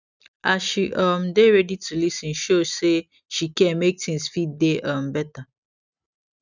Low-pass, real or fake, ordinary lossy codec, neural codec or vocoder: 7.2 kHz; real; none; none